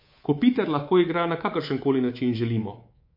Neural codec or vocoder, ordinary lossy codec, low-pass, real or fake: codec, 24 kHz, 3.1 kbps, DualCodec; MP3, 32 kbps; 5.4 kHz; fake